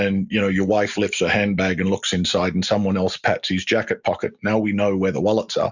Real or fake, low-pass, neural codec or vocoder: real; 7.2 kHz; none